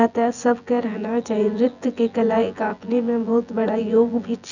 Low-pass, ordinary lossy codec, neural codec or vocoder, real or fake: 7.2 kHz; none; vocoder, 24 kHz, 100 mel bands, Vocos; fake